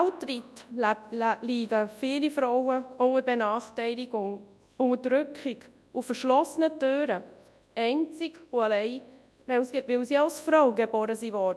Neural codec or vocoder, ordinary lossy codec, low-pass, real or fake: codec, 24 kHz, 0.9 kbps, WavTokenizer, large speech release; none; none; fake